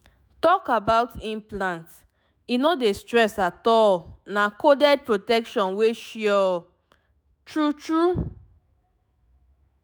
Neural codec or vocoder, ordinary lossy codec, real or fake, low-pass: autoencoder, 48 kHz, 128 numbers a frame, DAC-VAE, trained on Japanese speech; none; fake; none